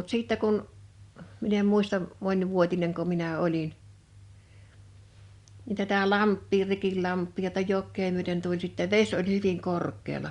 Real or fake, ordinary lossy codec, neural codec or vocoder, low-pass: real; Opus, 64 kbps; none; 10.8 kHz